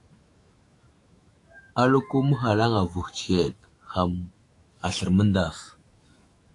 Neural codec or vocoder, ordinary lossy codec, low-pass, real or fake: autoencoder, 48 kHz, 128 numbers a frame, DAC-VAE, trained on Japanese speech; AAC, 48 kbps; 10.8 kHz; fake